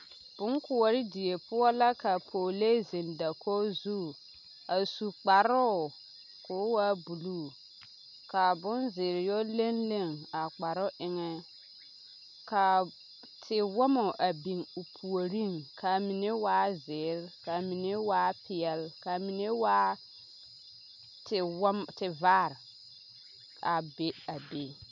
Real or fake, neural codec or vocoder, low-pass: real; none; 7.2 kHz